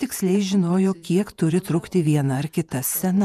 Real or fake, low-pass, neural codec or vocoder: fake; 14.4 kHz; vocoder, 48 kHz, 128 mel bands, Vocos